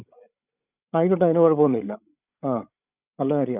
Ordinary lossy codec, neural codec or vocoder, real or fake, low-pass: none; codec, 16 kHz, 16 kbps, FreqCodec, larger model; fake; 3.6 kHz